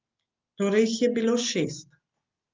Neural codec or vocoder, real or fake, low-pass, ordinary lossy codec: none; real; 7.2 kHz; Opus, 24 kbps